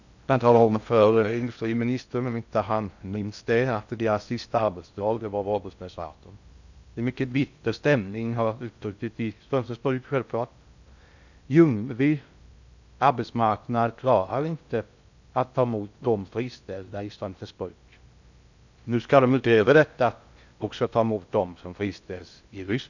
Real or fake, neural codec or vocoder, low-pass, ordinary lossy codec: fake; codec, 16 kHz in and 24 kHz out, 0.6 kbps, FocalCodec, streaming, 2048 codes; 7.2 kHz; none